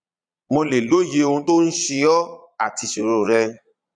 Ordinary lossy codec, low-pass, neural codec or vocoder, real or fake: none; 9.9 kHz; vocoder, 22.05 kHz, 80 mel bands, Vocos; fake